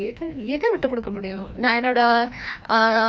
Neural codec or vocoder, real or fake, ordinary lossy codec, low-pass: codec, 16 kHz, 1 kbps, FreqCodec, larger model; fake; none; none